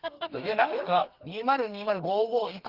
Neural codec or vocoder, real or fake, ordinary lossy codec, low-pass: codec, 32 kHz, 1.9 kbps, SNAC; fake; Opus, 32 kbps; 5.4 kHz